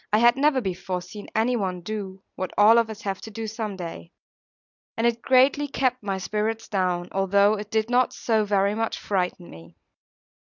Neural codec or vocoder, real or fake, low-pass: none; real; 7.2 kHz